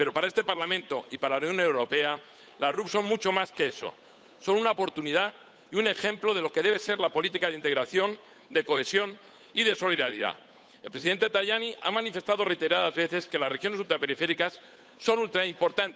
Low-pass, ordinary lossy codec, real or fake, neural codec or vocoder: none; none; fake; codec, 16 kHz, 8 kbps, FunCodec, trained on Chinese and English, 25 frames a second